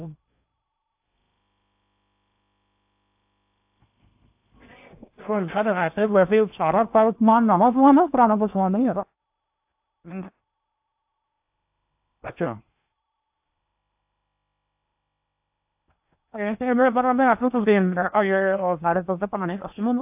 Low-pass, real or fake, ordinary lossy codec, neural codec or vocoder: 3.6 kHz; fake; AAC, 32 kbps; codec, 16 kHz in and 24 kHz out, 0.8 kbps, FocalCodec, streaming, 65536 codes